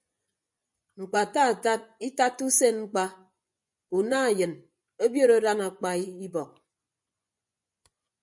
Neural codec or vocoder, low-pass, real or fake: vocoder, 24 kHz, 100 mel bands, Vocos; 10.8 kHz; fake